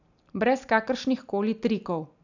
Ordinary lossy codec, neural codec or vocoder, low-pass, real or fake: none; none; 7.2 kHz; real